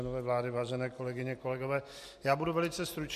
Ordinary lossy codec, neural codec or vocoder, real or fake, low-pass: MP3, 64 kbps; none; real; 14.4 kHz